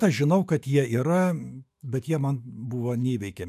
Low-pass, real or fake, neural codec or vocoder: 14.4 kHz; fake; autoencoder, 48 kHz, 128 numbers a frame, DAC-VAE, trained on Japanese speech